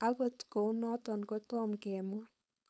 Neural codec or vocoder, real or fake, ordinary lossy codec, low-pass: codec, 16 kHz, 4.8 kbps, FACodec; fake; none; none